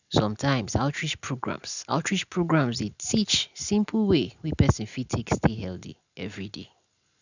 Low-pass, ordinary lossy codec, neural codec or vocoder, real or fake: 7.2 kHz; none; none; real